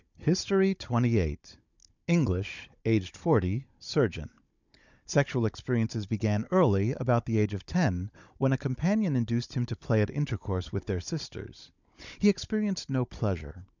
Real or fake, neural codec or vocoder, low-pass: fake; codec, 16 kHz, 16 kbps, FunCodec, trained on Chinese and English, 50 frames a second; 7.2 kHz